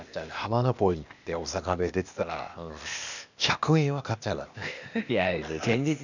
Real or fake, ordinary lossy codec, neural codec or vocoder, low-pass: fake; none; codec, 16 kHz, 0.8 kbps, ZipCodec; 7.2 kHz